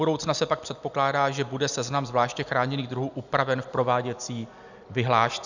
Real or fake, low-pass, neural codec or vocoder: real; 7.2 kHz; none